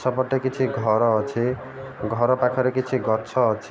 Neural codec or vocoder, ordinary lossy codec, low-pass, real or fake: none; none; none; real